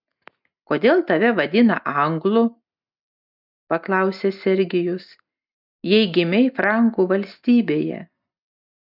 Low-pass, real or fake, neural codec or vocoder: 5.4 kHz; real; none